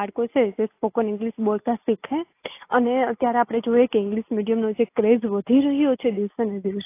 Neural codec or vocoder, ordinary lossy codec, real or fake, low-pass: none; AAC, 24 kbps; real; 3.6 kHz